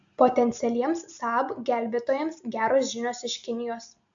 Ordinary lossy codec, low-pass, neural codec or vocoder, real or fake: AAC, 64 kbps; 7.2 kHz; none; real